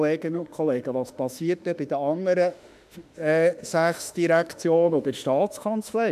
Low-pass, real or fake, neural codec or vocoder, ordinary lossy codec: 14.4 kHz; fake; autoencoder, 48 kHz, 32 numbers a frame, DAC-VAE, trained on Japanese speech; none